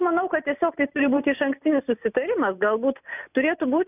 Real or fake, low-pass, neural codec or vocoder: real; 3.6 kHz; none